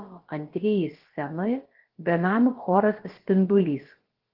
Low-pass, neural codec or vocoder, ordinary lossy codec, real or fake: 5.4 kHz; codec, 16 kHz, about 1 kbps, DyCAST, with the encoder's durations; Opus, 16 kbps; fake